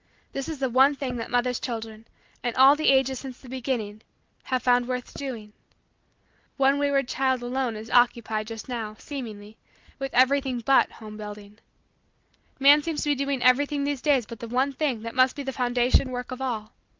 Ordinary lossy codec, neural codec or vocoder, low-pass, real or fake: Opus, 32 kbps; none; 7.2 kHz; real